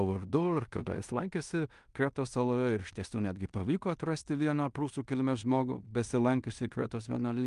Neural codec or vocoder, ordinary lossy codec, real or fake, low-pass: codec, 16 kHz in and 24 kHz out, 0.9 kbps, LongCat-Audio-Codec, fine tuned four codebook decoder; Opus, 32 kbps; fake; 10.8 kHz